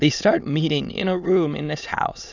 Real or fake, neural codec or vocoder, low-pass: fake; autoencoder, 22.05 kHz, a latent of 192 numbers a frame, VITS, trained on many speakers; 7.2 kHz